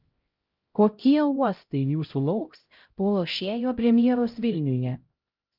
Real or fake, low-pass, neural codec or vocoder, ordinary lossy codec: fake; 5.4 kHz; codec, 16 kHz, 0.5 kbps, X-Codec, HuBERT features, trained on LibriSpeech; Opus, 24 kbps